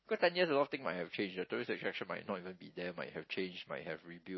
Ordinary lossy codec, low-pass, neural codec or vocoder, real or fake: MP3, 24 kbps; 7.2 kHz; none; real